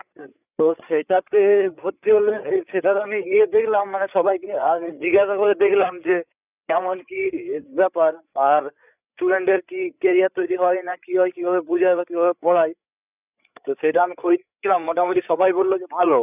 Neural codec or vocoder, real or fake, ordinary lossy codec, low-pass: codec, 16 kHz, 8 kbps, FreqCodec, larger model; fake; none; 3.6 kHz